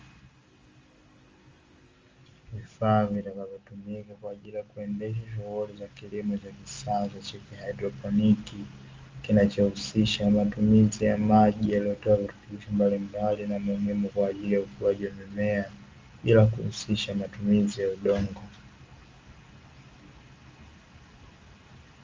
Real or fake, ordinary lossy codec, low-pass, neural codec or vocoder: real; Opus, 32 kbps; 7.2 kHz; none